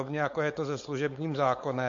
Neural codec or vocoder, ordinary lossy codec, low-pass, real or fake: codec, 16 kHz, 4.8 kbps, FACodec; MP3, 48 kbps; 7.2 kHz; fake